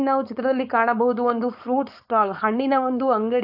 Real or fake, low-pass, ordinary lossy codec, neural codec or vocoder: fake; 5.4 kHz; AAC, 48 kbps; codec, 16 kHz, 4.8 kbps, FACodec